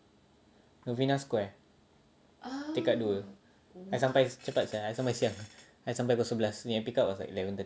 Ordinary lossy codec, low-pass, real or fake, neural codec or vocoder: none; none; real; none